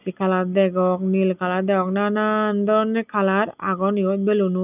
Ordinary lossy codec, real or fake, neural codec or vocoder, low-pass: none; real; none; 3.6 kHz